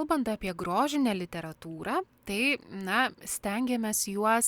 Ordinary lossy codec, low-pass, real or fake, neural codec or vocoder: Opus, 64 kbps; 19.8 kHz; real; none